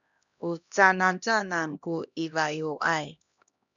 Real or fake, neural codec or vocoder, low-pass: fake; codec, 16 kHz, 1 kbps, X-Codec, HuBERT features, trained on LibriSpeech; 7.2 kHz